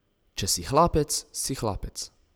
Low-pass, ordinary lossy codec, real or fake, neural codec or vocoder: none; none; real; none